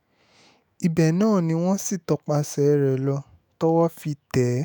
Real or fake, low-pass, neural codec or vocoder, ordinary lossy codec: fake; none; autoencoder, 48 kHz, 128 numbers a frame, DAC-VAE, trained on Japanese speech; none